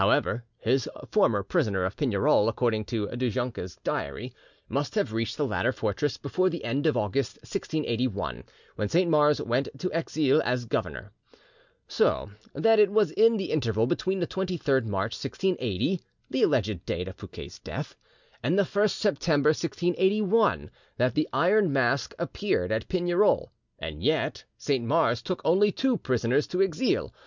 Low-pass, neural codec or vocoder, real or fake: 7.2 kHz; none; real